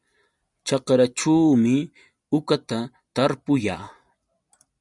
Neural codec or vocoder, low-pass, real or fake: none; 10.8 kHz; real